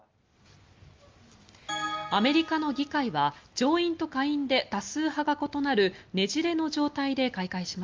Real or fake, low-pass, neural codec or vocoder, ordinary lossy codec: real; 7.2 kHz; none; Opus, 32 kbps